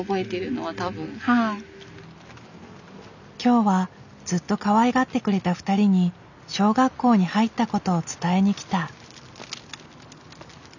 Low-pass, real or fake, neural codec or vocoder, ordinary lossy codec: 7.2 kHz; real; none; none